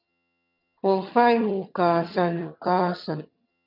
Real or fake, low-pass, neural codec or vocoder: fake; 5.4 kHz; vocoder, 22.05 kHz, 80 mel bands, HiFi-GAN